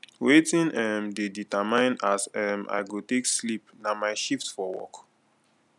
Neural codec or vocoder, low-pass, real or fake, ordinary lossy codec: none; 10.8 kHz; real; none